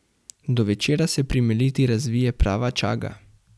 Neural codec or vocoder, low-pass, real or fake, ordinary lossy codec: none; none; real; none